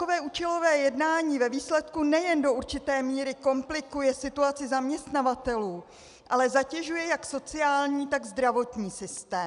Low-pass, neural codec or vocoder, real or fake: 10.8 kHz; none; real